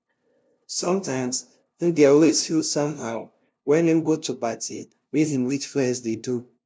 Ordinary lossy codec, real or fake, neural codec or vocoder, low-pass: none; fake; codec, 16 kHz, 0.5 kbps, FunCodec, trained on LibriTTS, 25 frames a second; none